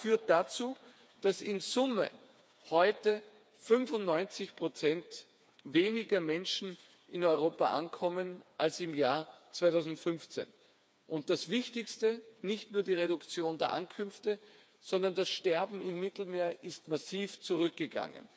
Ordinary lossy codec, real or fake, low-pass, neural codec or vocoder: none; fake; none; codec, 16 kHz, 4 kbps, FreqCodec, smaller model